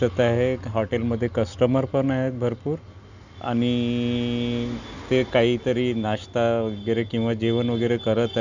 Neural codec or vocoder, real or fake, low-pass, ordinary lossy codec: none; real; 7.2 kHz; none